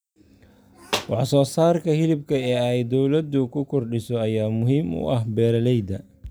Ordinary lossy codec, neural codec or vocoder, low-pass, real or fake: none; none; none; real